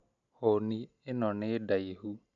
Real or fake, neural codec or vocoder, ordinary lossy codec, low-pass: real; none; none; 7.2 kHz